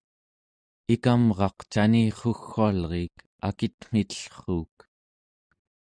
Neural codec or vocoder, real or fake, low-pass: none; real; 9.9 kHz